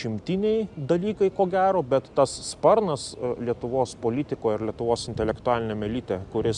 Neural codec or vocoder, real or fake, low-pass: none; real; 10.8 kHz